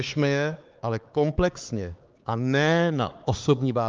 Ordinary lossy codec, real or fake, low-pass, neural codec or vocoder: Opus, 24 kbps; fake; 7.2 kHz; codec, 16 kHz, 4 kbps, X-Codec, HuBERT features, trained on LibriSpeech